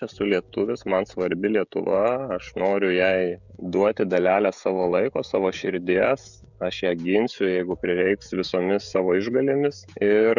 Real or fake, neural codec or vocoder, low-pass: fake; codec, 16 kHz, 16 kbps, FreqCodec, smaller model; 7.2 kHz